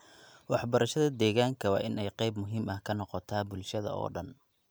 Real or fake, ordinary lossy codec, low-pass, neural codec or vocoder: real; none; none; none